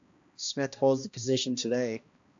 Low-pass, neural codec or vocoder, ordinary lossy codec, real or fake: 7.2 kHz; codec, 16 kHz, 1 kbps, X-Codec, HuBERT features, trained on balanced general audio; AAC, 64 kbps; fake